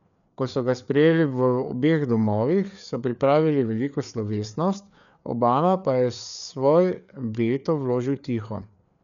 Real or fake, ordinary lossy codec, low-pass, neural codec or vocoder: fake; none; 7.2 kHz; codec, 16 kHz, 4 kbps, FreqCodec, larger model